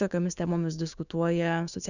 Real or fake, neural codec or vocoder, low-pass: fake; codec, 16 kHz, 6 kbps, DAC; 7.2 kHz